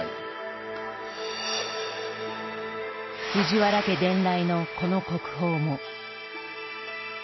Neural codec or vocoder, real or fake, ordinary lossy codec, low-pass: none; real; MP3, 24 kbps; 7.2 kHz